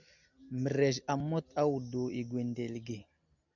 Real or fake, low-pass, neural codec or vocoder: real; 7.2 kHz; none